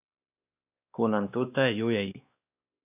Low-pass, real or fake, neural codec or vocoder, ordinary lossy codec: 3.6 kHz; fake; codec, 16 kHz, 2 kbps, X-Codec, WavLM features, trained on Multilingual LibriSpeech; AAC, 32 kbps